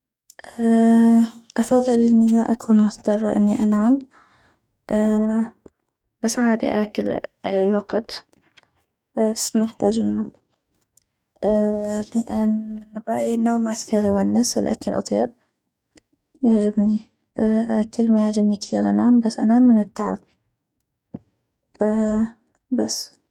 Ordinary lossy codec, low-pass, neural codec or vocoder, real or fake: none; 19.8 kHz; codec, 44.1 kHz, 2.6 kbps, DAC; fake